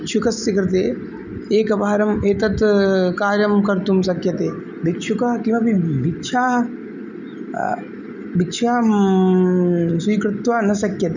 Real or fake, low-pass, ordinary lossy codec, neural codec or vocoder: real; 7.2 kHz; none; none